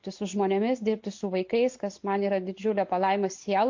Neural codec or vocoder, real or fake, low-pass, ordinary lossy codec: none; real; 7.2 kHz; MP3, 48 kbps